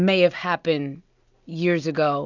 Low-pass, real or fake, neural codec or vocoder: 7.2 kHz; real; none